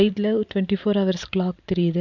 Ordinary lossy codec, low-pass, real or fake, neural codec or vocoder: none; 7.2 kHz; fake; vocoder, 44.1 kHz, 128 mel bands every 512 samples, BigVGAN v2